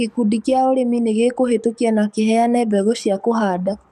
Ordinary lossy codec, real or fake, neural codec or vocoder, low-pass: MP3, 96 kbps; fake; codec, 44.1 kHz, 7.8 kbps, DAC; 14.4 kHz